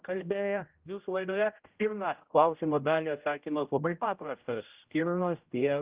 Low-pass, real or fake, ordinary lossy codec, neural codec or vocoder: 3.6 kHz; fake; Opus, 32 kbps; codec, 16 kHz, 0.5 kbps, X-Codec, HuBERT features, trained on general audio